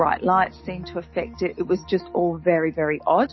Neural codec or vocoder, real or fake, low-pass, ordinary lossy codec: none; real; 7.2 kHz; MP3, 24 kbps